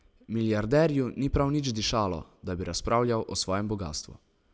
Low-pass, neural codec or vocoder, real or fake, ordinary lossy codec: none; none; real; none